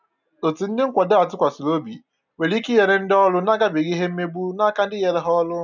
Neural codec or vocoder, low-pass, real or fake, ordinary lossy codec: none; 7.2 kHz; real; none